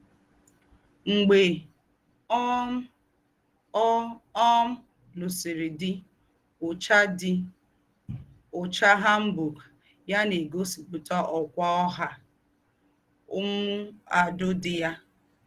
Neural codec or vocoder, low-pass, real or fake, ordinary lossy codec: none; 14.4 kHz; real; Opus, 16 kbps